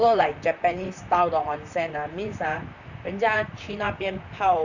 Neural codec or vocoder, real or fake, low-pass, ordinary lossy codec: vocoder, 44.1 kHz, 128 mel bands, Pupu-Vocoder; fake; 7.2 kHz; none